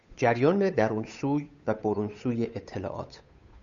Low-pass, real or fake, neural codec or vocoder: 7.2 kHz; fake; codec, 16 kHz, 8 kbps, FunCodec, trained on Chinese and English, 25 frames a second